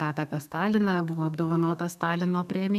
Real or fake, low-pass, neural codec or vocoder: fake; 14.4 kHz; codec, 32 kHz, 1.9 kbps, SNAC